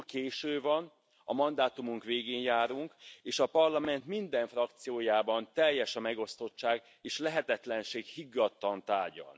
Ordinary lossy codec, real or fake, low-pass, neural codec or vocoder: none; real; none; none